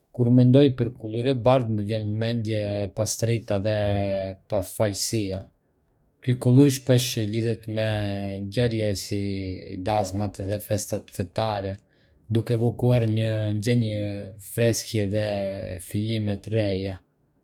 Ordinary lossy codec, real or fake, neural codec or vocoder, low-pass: none; fake; codec, 44.1 kHz, 2.6 kbps, DAC; 19.8 kHz